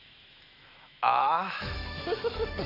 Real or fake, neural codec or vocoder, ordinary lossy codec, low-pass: fake; autoencoder, 48 kHz, 128 numbers a frame, DAC-VAE, trained on Japanese speech; none; 5.4 kHz